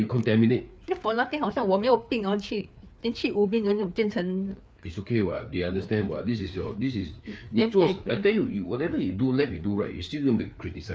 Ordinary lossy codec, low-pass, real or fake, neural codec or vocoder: none; none; fake; codec, 16 kHz, 4 kbps, FreqCodec, larger model